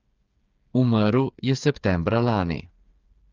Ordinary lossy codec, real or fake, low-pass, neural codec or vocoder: Opus, 24 kbps; fake; 7.2 kHz; codec, 16 kHz, 8 kbps, FreqCodec, smaller model